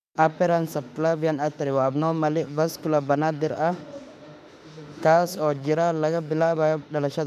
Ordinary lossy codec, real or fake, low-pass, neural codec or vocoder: none; fake; 14.4 kHz; autoencoder, 48 kHz, 32 numbers a frame, DAC-VAE, trained on Japanese speech